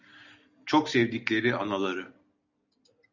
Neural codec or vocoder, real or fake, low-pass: none; real; 7.2 kHz